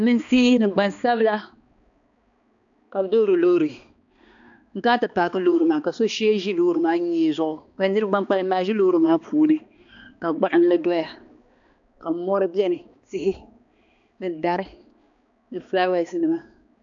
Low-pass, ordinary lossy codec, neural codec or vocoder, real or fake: 7.2 kHz; AAC, 64 kbps; codec, 16 kHz, 2 kbps, X-Codec, HuBERT features, trained on balanced general audio; fake